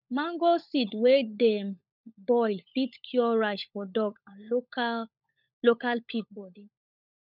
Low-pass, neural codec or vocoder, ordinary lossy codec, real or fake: 5.4 kHz; codec, 16 kHz, 16 kbps, FunCodec, trained on LibriTTS, 50 frames a second; none; fake